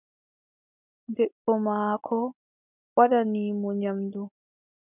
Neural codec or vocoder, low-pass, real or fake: none; 3.6 kHz; real